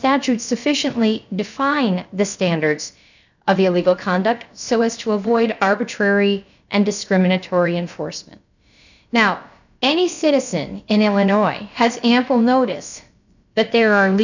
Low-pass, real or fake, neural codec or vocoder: 7.2 kHz; fake; codec, 16 kHz, about 1 kbps, DyCAST, with the encoder's durations